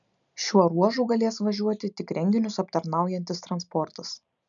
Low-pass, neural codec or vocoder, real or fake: 7.2 kHz; none; real